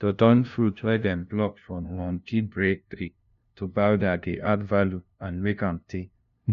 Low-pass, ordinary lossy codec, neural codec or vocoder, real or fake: 7.2 kHz; none; codec, 16 kHz, 0.5 kbps, FunCodec, trained on LibriTTS, 25 frames a second; fake